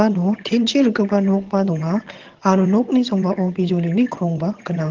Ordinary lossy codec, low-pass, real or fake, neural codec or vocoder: Opus, 16 kbps; 7.2 kHz; fake; vocoder, 22.05 kHz, 80 mel bands, HiFi-GAN